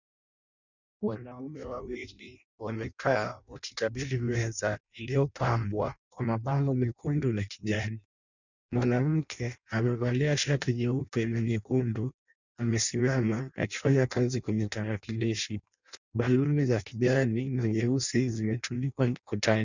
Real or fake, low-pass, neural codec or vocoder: fake; 7.2 kHz; codec, 16 kHz in and 24 kHz out, 0.6 kbps, FireRedTTS-2 codec